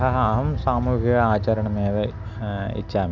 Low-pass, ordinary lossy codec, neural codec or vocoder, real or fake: 7.2 kHz; none; none; real